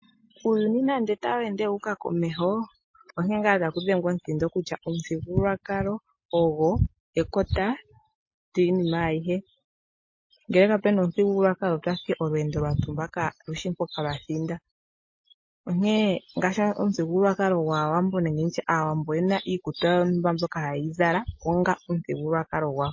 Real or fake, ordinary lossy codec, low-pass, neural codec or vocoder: real; MP3, 32 kbps; 7.2 kHz; none